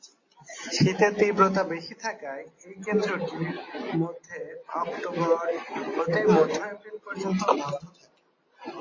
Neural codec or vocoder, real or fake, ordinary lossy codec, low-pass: none; real; MP3, 32 kbps; 7.2 kHz